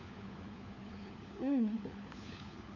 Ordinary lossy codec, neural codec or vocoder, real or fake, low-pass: none; codec, 16 kHz, 4 kbps, FunCodec, trained on LibriTTS, 50 frames a second; fake; 7.2 kHz